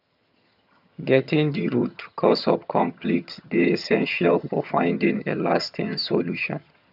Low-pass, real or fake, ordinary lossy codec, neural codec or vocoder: 5.4 kHz; fake; none; vocoder, 22.05 kHz, 80 mel bands, HiFi-GAN